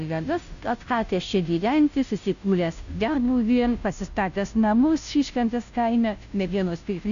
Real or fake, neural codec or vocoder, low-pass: fake; codec, 16 kHz, 0.5 kbps, FunCodec, trained on Chinese and English, 25 frames a second; 7.2 kHz